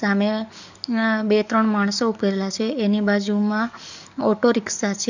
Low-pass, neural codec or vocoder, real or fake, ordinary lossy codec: 7.2 kHz; codec, 44.1 kHz, 7.8 kbps, DAC; fake; none